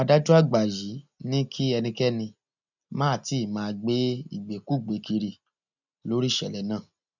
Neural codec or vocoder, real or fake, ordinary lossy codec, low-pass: none; real; none; 7.2 kHz